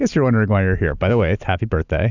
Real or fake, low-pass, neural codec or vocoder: real; 7.2 kHz; none